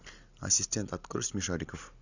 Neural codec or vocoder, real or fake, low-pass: none; real; 7.2 kHz